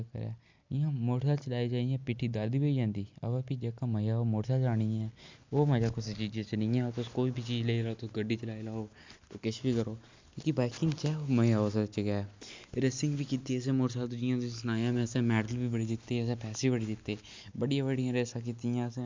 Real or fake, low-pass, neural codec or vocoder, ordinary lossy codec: real; 7.2 kHz; none; MP3, 64 kbps